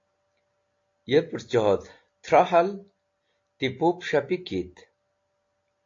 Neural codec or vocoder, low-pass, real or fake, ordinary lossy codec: none; 7.2 kHz; real; AAC, 48 kbps